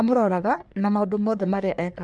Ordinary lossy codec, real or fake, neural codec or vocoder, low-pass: none; fake; codec, 44.1 kHz, 3.4 kbps, Pupu-Codec; 10.8 kHz